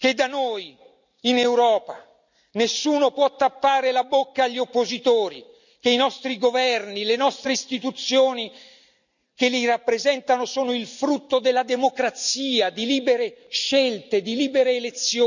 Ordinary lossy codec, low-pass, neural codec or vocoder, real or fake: none; 7.2 kHz; none; real